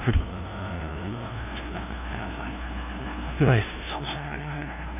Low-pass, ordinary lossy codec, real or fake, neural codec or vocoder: 3.6 kHz; none; fake; codec, 16 kHz, 0.5 kbps, FunCodec, trained on LibriTTS, 25 frames a second